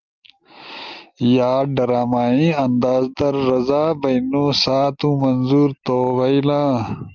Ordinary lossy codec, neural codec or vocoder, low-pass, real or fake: Opus, 32 kbps; none; 7.2 kHz; real